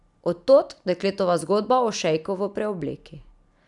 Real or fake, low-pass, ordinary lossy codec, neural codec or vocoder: real; 10.8 kHz; none; none